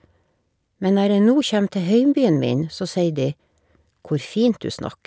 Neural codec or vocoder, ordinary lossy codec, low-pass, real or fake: none; none; none; real